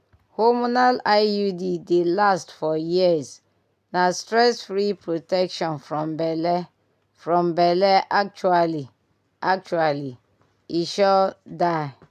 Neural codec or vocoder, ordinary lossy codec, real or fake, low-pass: none; none; real; 14.4 kHz